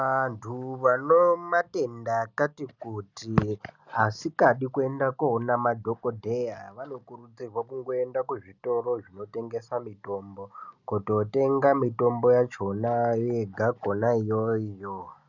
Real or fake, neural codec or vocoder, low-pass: real; none; 7.2 kHz